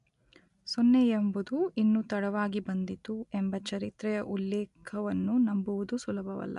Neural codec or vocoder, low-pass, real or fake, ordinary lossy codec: none; 10.8 kHz; real; MP3, 64 kbps